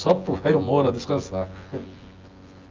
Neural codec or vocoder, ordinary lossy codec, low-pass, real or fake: vocoder, 24 kHz, 100 mel bands, Vocos; Opus, 32 kbps; 7.2 kHz; fake